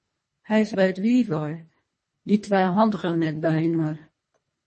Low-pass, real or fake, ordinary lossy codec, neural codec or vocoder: 10.8 kHz; fake; MP3, 32 kbps; codec, 24 kHz, 1.5 kbps, HILCodec